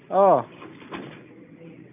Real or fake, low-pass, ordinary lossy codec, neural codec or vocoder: real; 3.6 kHz; none; none